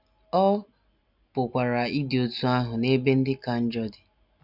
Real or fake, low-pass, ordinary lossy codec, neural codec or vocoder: real; 5.4 kHz; none; none